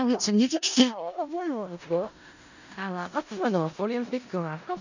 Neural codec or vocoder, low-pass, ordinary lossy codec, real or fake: codec, 16 kHz in and 24 kHz out, 0.4 kbps, LongCat-Audio-Codec, four codebook decoder; 7.2 kHz; none; fake